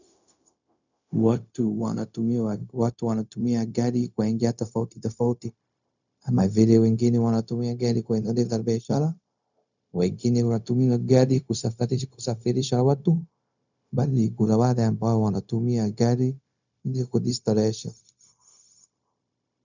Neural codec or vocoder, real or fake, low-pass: codec, 16 kHz, 0.4 kbps, LongCat-Audio-Codec; fake; 7.2 kHz